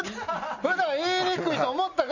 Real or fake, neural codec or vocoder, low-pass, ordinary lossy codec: real; none; 7.2 kHz; none